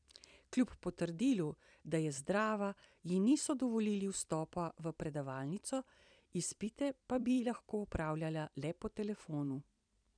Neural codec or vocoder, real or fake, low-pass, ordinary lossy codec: vocoder, 44.1 kHz, 128 mel bands every 256 samples, BigVGAN v2; fake; 9.9 kHz; none